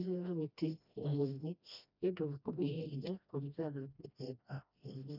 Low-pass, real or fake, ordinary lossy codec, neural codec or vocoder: 5.4 kHz; fake; none; codec, 16 kHz, 1 kbps, FreqCodec, smaller model